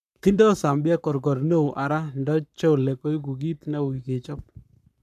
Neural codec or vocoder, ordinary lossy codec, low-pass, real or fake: codec, 44.1 kHz, 7.8 kbps, Pupu-Codec; none; 14.4 kHz; fake